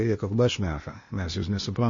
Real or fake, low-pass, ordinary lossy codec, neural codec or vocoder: fake; 7.2 kHz; MP3, 32 kbps; codec, 16 kHz, 0.8 kbps, ZipCodec